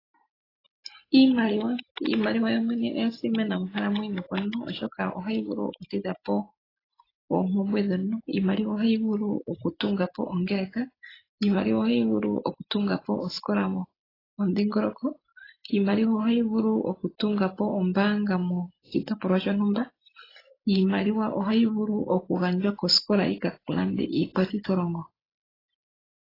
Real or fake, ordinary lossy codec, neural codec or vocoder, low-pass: real; AAC, 24 kbps; none; 5.4 kHz